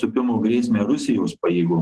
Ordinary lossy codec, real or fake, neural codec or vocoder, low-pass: Opus, 16 kbps; real; none; 10.8 kHz